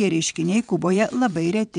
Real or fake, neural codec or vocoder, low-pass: fake; vocoder, 22.05 kHz, 80 mel bands, WaveNeXt; 9.9 kHz